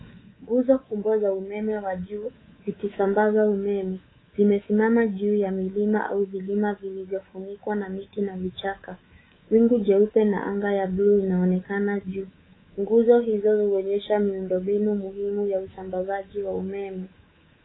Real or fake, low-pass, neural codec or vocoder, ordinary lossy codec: fake; 7.2 kHz; codec, 24 kHz, 3.1 kbps, DualCodec; AAC, 16 kbps